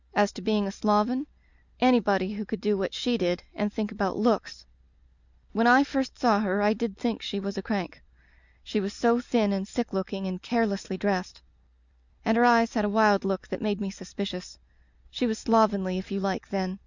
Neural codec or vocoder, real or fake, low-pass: none; real; 7.2 kHz